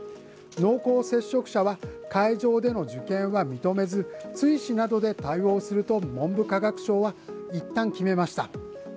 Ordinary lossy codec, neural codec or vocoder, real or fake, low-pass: none; none; real; none